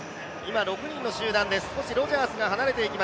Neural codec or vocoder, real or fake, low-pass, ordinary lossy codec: none; real; none; none